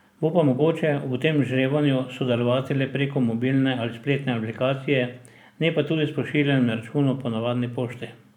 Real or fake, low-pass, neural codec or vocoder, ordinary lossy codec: fake; 19.8 kHz; vocoder, 44.1 kHz, 128 mel bands every 512 samples, BigVGAN v2; none